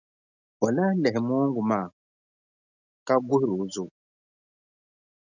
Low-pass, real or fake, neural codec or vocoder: 7.2 kHz; real; none